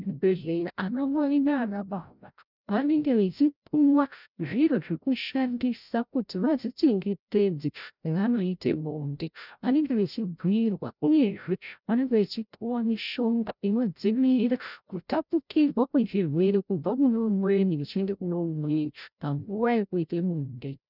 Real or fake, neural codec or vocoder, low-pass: fake; codec, 16 kHz, 0.5 kbps, FreqCodec, larger model; 5.4 kHz